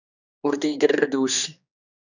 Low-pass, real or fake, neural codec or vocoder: 7.2 kHz; fake; codec, 16 kHz, 2 kbps, X-Codec, HuBERT features, trained on general audio